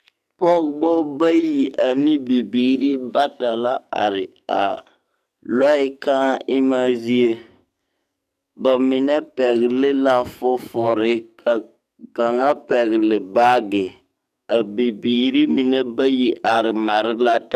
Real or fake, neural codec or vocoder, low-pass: fake; codec, 44.1 kHz, 2.6 kbps, SNAC; 14.4 kHz